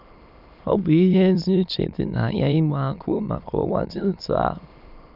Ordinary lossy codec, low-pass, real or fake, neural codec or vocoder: none; 5.4 kHz; fake; autoencoder, 22.05 kHz, a latent of 192 numbers a frame, VITS, trained on many speakers